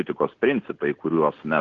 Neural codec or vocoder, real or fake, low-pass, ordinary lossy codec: none; real; 7.2 kHz; Opus, 16 kbps